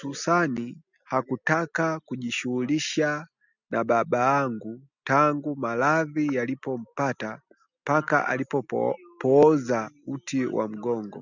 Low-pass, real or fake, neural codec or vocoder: 7.2 kHz; real; none